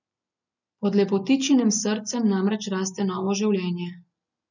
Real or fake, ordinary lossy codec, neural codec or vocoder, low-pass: real; none; none; 7.2 kHz